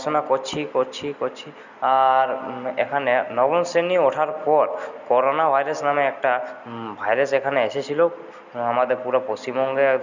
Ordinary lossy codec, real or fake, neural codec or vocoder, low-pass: none; real; none; 7.2 kHz